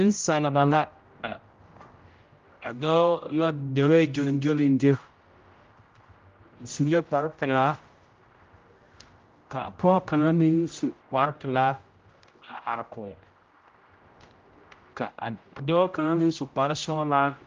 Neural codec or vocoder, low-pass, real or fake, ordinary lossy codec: codec, 16 kHz, 0.5 kbps, X-Codec, HuBERT features, trained on general audio; 7.2 kHz; fake; Opus, 32 kbps